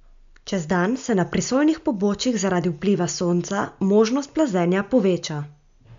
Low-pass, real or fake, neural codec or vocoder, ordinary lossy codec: 7.2 kHz; real; none; MP3, 64 kbps